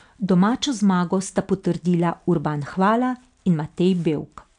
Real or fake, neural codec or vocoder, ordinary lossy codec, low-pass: real; none; none; 9.9 kHz